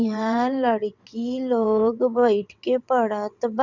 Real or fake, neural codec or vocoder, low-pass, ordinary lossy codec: fake; vocoder, 22.05 kHz, 80 mel bands, WaveNeXt; 7.2 kHz; Opus, 64 kbps